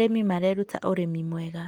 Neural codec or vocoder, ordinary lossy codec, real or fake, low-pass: none; Opus, 24 kbps; real; 19.8 kHz